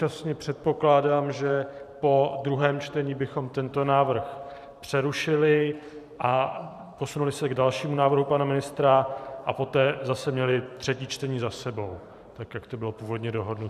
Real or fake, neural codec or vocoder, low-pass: fake; vocoder, 44.1 kHz, 128 mel bands every 512 samples, BigVGAN v2; 14.4 kHz